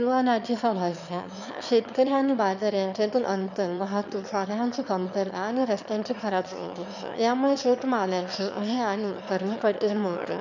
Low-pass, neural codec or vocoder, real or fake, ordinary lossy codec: 7.2 kHz; autoencoder, 22.05 kHz, a latent of 192 numbers a frame, VITS, trained on one speaker; fake; none